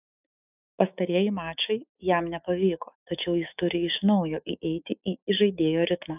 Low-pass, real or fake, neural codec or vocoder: 3.6 kHz; fake; autoencoder, 48 kHz, 128 numbers a frame, DAC-VAE, trained on Japanese speech